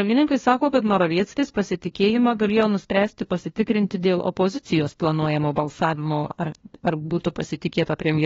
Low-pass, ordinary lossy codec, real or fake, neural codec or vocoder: 7.2 kHz; AAC, 24 kbps; fake; codec, 16 kHz, 1 kbps, FunCodec, trained on LibriTTS, 50 frames a second